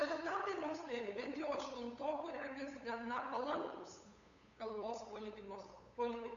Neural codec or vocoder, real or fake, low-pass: codec, 16 kHz, 8 kbps, FunCodec, trained on LibriTTS, 25 frames a second; fake; 7.2 kHz